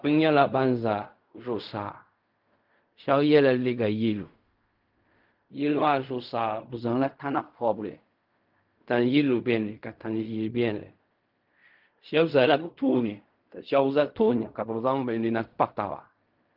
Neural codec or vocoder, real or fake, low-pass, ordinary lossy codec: codec, 16 kHz in and 24 kHz out, 0.4 kbps, LongCat-Audio-Codec, fine tuned four codebook decoder; fake; 5.4 kHz; Opus, 24 kbps